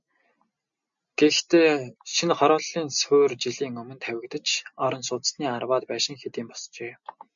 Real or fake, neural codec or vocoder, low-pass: real; none; 7.2 kHz